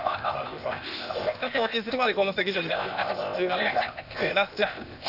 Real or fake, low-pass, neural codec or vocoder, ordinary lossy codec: fake; 5.4 kHz; codec, 16 kHz, 0.8 kbps, ZipCodec; none